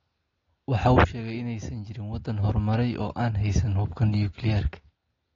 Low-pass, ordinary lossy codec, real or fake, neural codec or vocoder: 7.2 kHz; AAC, 32 kbps; real; none